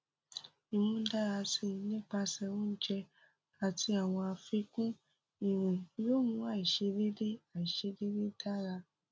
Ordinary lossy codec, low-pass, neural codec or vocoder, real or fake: none; none; none; real